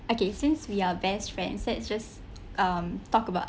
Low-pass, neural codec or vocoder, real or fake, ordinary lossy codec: none; none; real; none